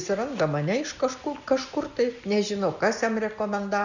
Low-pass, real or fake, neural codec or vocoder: 7.2 kHz; real; none